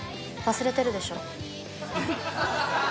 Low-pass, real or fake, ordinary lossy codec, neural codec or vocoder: none; real; none; none